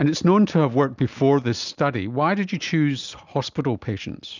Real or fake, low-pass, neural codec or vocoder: real; 7.2 kHz; none